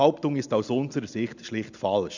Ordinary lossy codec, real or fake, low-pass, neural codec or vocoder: none; real; 7.2 kHz; none